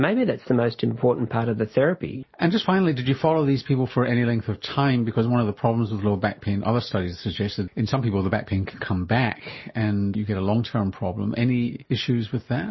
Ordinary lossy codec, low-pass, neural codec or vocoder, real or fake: MP3, 24 kbps; 7.2 kHz; none; real